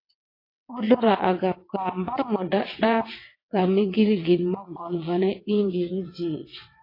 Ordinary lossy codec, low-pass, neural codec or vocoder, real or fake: AAC, 24 kbps; 5.4 kHz; vocoder, 22.05 kHz, 80 mel bands, WaveNeXt; fake